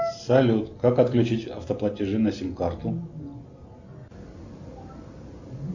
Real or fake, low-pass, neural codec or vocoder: real; 7.2 kHz; none